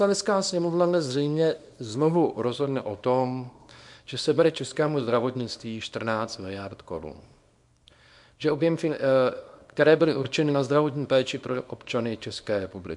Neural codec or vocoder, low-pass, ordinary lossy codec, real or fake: codec, 24 kHz, 0.9 kbps, WavTokenizer, small release; 10.8 kHz; MP3, 64 kbps; fake